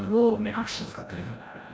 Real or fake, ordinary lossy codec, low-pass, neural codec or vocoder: fake; none; none; codec, 16 kHz, 0.5 kbps, FreqCodec, larger model